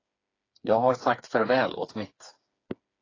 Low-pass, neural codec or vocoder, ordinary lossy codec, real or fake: 7.2 kHz; codec, 16 kHz, 4 kbps, FreqCodec, smaller model; AAC, 32 kbps; fake